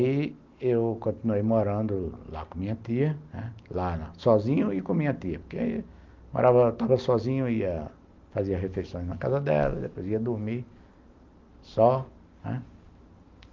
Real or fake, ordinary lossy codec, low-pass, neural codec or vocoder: real; Opus, 32 kbps; 7.2 kHz; none